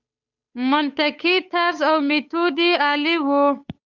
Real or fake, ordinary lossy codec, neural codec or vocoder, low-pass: fake; Opus, 64 kbps; codec, 16 kHz, 8 kbps, FunCodec, trained on Chinese and English, 25 frames a second; 7.2 kHz